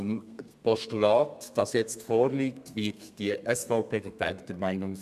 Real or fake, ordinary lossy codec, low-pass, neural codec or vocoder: fake; none; 14.4 kHz; codec, 32 kHz, 1.9 kbps, SNAC